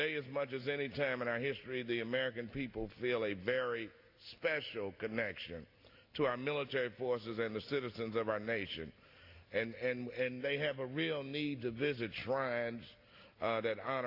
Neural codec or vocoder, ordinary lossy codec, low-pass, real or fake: none; AAC, 32 kbps; 5.4 kHz; real